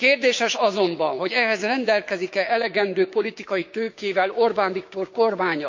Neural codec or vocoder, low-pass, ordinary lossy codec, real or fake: codec, 16 kHz, 6 kbps, DAC; 7.2 kHz; MP3, 48 kbps; fake